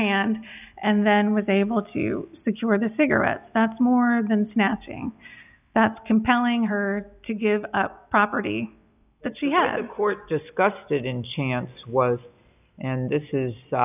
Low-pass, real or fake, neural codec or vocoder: 3.6 kHz; real; none